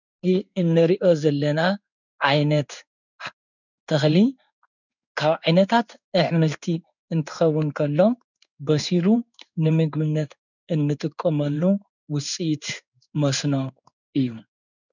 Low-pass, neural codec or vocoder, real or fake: 7.2 kHz; codec, 16 kHz in and 24 kHz out, 1 kbps, XY-Tokenizer; fake